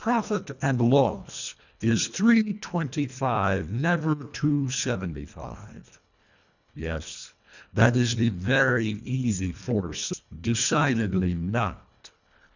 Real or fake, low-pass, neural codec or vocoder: fake; 7.2 kHz; codec, 24 kHz, 1.5 kbps, HILCodec